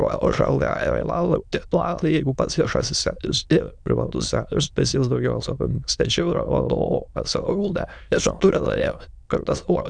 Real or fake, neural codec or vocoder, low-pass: fake; autoencoder, 22.05 kHz, a latent of 192 numbers a frame, VITS, trained on many speakers; 9.9 kHz